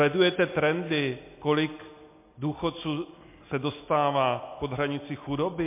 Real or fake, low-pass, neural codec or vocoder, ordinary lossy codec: real; 3.6 kHz; none; MP3, 24 kbps